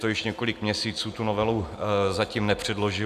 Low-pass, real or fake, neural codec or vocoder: 14.4 kHz; real; none